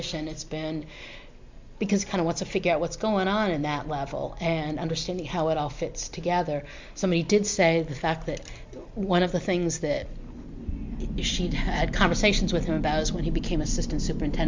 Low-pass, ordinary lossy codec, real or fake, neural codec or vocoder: 7.2 kHz; MP3, 64 kbps; real; none